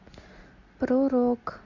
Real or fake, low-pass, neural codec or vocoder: real; 7.2 kHz; none